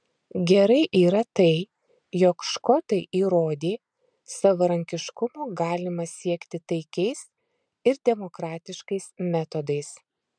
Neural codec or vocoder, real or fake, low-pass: none; real; 9.9 kHz